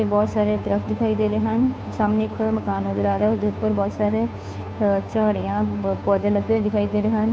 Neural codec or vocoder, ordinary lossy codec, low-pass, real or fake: codec, 16 kHz, 2 kbps, FunCodec, trained on Chinese and English, 25 frames a second; none; none; fake